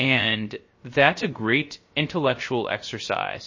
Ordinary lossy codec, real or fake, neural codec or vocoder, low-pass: MP3, 32 kbps; fake; codec, 16 kHz, 0.3 kbps, FocalCodec; 7.2 kHz